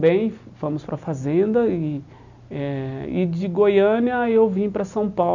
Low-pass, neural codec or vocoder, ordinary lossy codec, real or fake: 7.2 kHz; none; none; real